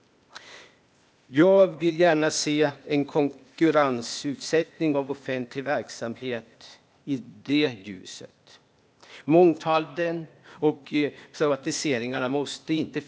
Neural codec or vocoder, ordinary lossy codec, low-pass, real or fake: codec, 16 kHz, 0.8 kbps, ZipCodec; none; none; fake